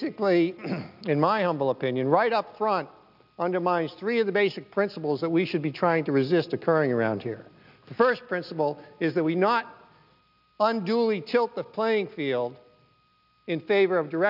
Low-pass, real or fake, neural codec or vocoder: 5.4 kHz; real; none